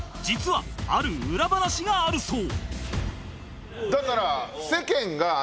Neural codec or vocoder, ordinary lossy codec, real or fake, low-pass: none; none; real; none